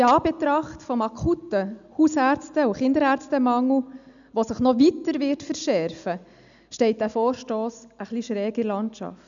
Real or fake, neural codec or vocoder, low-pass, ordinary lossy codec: real; none; 7.2 kHz; none